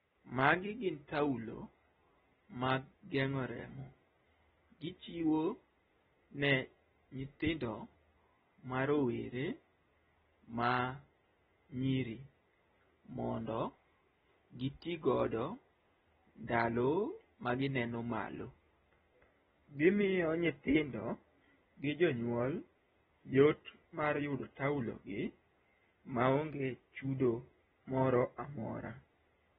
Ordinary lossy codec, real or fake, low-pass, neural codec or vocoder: AAC, 16 kbps; real; 19.8 kHz; none